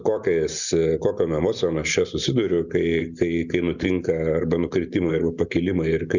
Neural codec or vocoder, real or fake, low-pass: none; real; 7.2 kHz